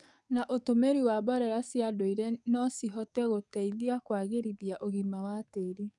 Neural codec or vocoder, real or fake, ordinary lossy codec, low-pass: codec, 44.1 kHz, 7.8 kbps, DAC; fake; none; 10.8 kHz